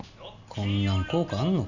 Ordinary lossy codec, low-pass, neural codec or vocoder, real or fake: none; 7.2 kHz; none; real